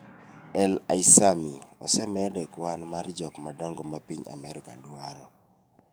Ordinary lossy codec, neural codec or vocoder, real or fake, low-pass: none; codec, 44.1 kHz, 7.8 kbps, DAC; fake; none